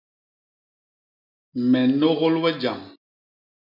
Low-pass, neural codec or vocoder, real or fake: 5.4 kHz; none; real